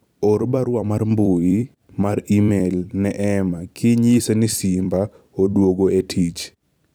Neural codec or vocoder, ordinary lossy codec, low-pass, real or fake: vocoder, 44.1 kHz, 128 mel bands every 256 samples, BigVGAN v2; none; none; fake